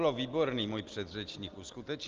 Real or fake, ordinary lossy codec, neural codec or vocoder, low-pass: real; Opus, 32 kbps; none; 7.2 kHz